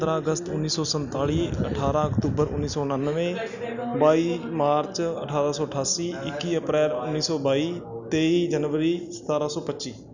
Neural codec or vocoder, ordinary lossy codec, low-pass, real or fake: none; none; 7.2 kHz; real